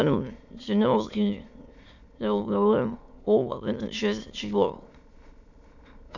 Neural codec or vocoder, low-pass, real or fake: autoencoder, 22.05 kHz, a latent of 192 numbers a frame, VITS, trained on many speakers; 7.2 kHz; fake